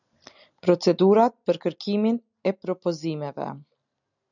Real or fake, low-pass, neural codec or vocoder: real; 7.2 kHz; none